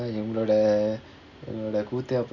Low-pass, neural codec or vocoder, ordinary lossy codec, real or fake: 7.2 kHz; none; none; real